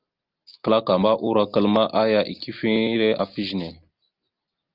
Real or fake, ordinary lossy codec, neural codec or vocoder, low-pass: real; Opus, 24 kbps; none; 5.4 kHz